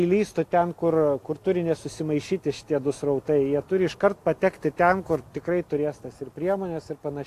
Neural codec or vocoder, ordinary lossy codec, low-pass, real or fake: none; AAC, 64 kbps; 14.4 kHz; real